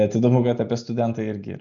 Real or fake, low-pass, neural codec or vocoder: real; 7.2 kHz; none